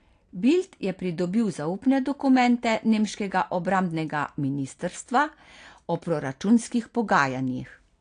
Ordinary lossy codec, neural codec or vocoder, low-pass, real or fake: AAC, 48 kbps; none; 9.9 kHz; real